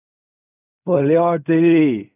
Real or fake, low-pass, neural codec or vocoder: fake; 3.6 kHz; codec, 16 kHz in and 24 kHz out, 0.4 kbps, LongCat-Audio-Codec, fine tuned four codebook decoder